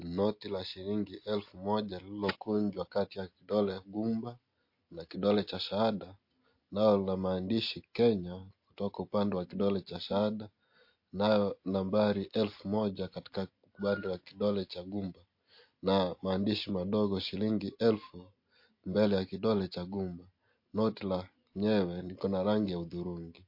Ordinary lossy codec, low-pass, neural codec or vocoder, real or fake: MP3, 32 kbps; 5.4 kHz; none; real